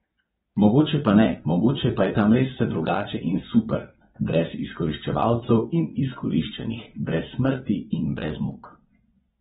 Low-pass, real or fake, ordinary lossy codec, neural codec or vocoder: 9.9 kHz; fake; AAC, 16 kbps; vocoder, 22.05 kHz, 80 mel bands, Vocos